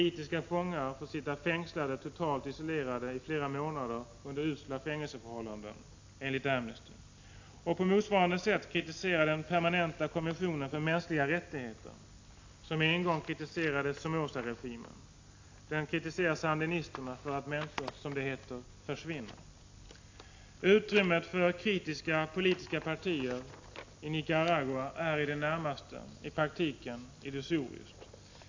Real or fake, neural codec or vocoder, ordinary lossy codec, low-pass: real; none; AAC, 48 kbps; 7.2 kHz